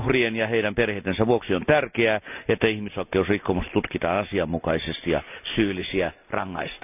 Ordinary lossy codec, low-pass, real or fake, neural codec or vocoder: none; 3.6 kHz; real; none